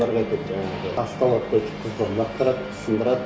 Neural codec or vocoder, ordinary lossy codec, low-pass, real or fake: none; none; none; real